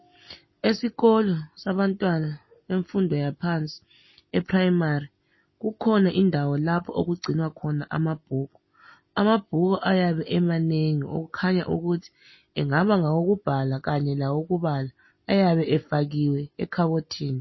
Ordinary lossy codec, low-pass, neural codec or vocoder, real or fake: MP3, 24 kbps; 7.2 kHz; none; real